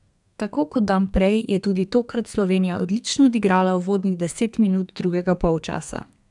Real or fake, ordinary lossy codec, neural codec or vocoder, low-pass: fake; none; codec, 32 kHz, 1.9 kbps, SNAC; 10.8 kHz